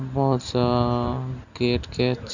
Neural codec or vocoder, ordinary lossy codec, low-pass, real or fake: none; none; 7.2 kHz; real